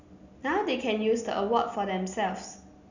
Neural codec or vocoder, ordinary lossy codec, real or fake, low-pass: none; none; real; 7.2 kHz